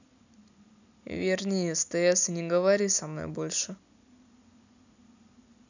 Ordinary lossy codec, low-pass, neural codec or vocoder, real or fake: none; 7.2 kHz; none; real